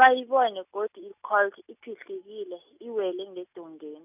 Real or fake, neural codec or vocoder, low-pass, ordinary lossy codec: real; none; 3.6 kHz; none